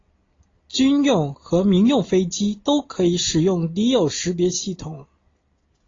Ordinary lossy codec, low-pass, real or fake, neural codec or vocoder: AAC, 32 kbps; 7.2 kHz; real; none